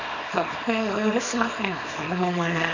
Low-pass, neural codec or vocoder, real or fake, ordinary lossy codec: 7.2 kHz; codec, 24 kHz, 0.9 kbps, WavTokenizer, small release; fake; none